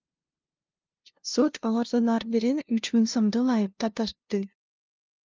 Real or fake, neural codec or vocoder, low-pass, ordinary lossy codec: fake; codec, 16 kHz, 0.5 kbps, FunCodec, trained on LibriTTS, 25 frames a second; 7.2 kHz; Opus, 24 kbps